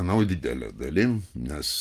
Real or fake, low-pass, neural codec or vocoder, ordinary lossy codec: real; 14.4 kHz; none; Opus, 32 kbps